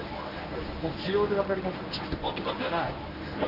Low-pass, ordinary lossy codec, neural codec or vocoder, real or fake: 5.4 kHz; none; codec, 24 kHz, 0.9 kbps, WavTokenizer, medium speech release version 1; fake